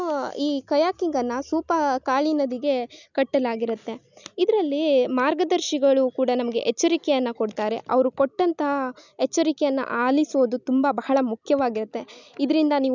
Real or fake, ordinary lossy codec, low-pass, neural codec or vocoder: real; none; 7.2 kHz; none